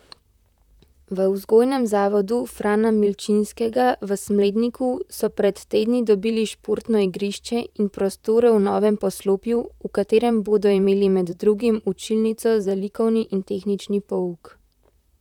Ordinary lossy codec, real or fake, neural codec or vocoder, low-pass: none; fake; vocoder, 44.1 kHz, 128 mel bands, Pupu-Vocoder; 19.8 kHz